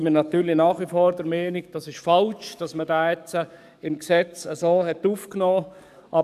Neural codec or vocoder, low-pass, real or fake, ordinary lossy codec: codec, 44.1 kHz, 7.8 kbps, DAC; 14.4 kHz; fake; none